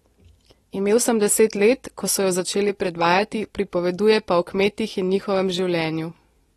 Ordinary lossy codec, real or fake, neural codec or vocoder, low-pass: AAC, 32 kbps; real; none; 19.8 kHz